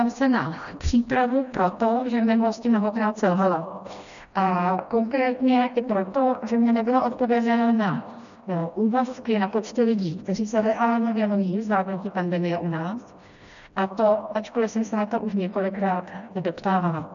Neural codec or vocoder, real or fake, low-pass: codec, 16 kHz, 1 kbps, FreqCodec, smaller model; fake; 7.2 kHz